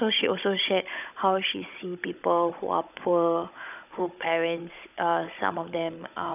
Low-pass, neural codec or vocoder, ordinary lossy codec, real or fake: 3.6 kHz; codec, 16 kHz, 16 kbps, FunCodec, trained on Chinese and English, 50 frames a second; none; fake